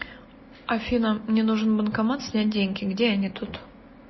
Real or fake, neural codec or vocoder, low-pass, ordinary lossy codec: real; none; 7.2 kHz; MP3, 24 kbps